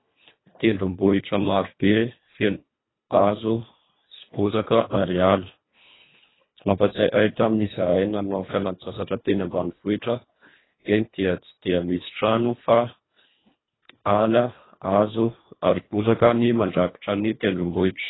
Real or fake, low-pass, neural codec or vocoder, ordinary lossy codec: fake; 7.2 kHz; codec, 24 kHz, 1.5 kbps, HILCodec; AAC, 16 kbps